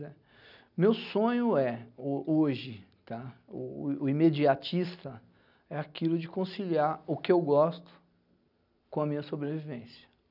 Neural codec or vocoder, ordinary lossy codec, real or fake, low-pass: none; none; real; 5.4 kHz